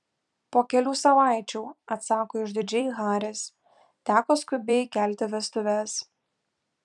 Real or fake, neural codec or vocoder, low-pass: fake; vocoder, 44.1 kHz, 128 mel bands every 256 samples, BigVGAN v2; 10.8 kHz